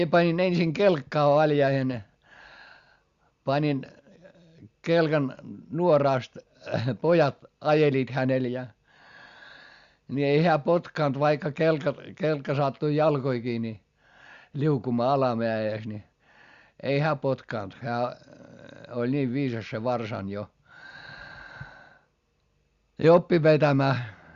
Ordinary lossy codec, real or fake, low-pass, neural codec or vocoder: Opus, 64 kbps; real; 7.2 kHz; none